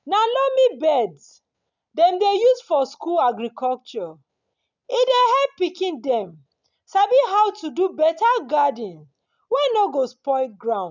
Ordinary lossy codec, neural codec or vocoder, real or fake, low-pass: none; none; real; 7.2 kHz